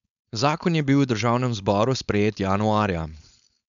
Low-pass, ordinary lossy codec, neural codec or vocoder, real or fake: 7.2 kHz; none; codec, 16 kHz, 4.8 kbps, FACodec; fake